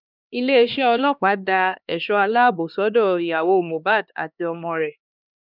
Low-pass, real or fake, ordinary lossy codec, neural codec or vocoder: 5.4 kHz; fake; none; codec, 16 kHz, 2 kbps, X-Codec, HuBERT features, trained on LibriSpeech